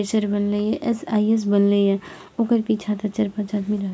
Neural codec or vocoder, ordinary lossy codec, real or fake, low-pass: none; none; real; none